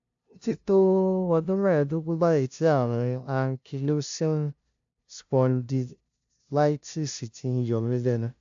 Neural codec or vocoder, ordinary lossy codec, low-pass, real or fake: codec, 16 kHz, 0.5 kbps, FunCodec, trained on LibriTTS, 25 frames a second; AAC, 64 kbps; 7.2 kHz; fake